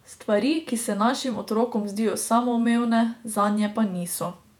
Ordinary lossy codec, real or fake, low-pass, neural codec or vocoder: none; fake; 19.8 kHz; vocoder, 48 kHz, 128 mel bands, Vocos